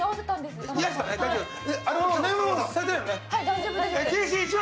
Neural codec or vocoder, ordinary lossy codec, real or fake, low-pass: none; none; real; none